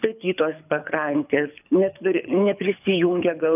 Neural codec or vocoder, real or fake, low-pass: codec, 24 kHz, 6 kbps, HILCodec; fake; 3.6 kHz